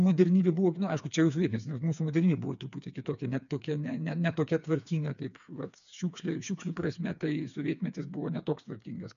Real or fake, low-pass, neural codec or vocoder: fake; 7.2 kHz; codec, 16 kHz, 4 kbps, FreqCodec, smaller model